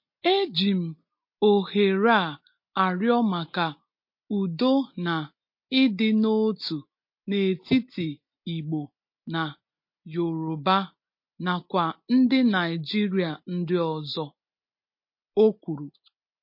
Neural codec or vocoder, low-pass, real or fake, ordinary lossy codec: none; 5.4 kHz; real; MP3, 32 kbps